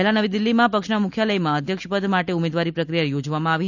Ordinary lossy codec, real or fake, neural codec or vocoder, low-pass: none; real; none; 7.2 kHz